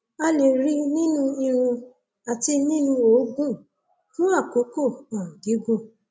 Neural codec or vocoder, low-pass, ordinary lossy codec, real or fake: none; none; none; real